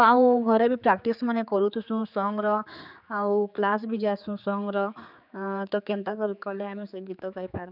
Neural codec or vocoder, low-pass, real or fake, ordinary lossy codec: codec, 16 kHz, 4 kbps, X-Codec, HuBERT features, trained on general audio; 5.4 kHz; fake; none